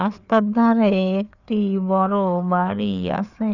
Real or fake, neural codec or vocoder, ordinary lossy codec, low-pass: fake; codec, 16 kHz, 4 kbps, FreqCodec, larger model; none; 7.2 kHz